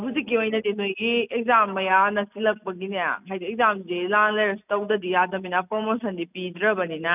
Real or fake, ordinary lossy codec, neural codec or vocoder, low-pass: real; none; none; 3.6 kHz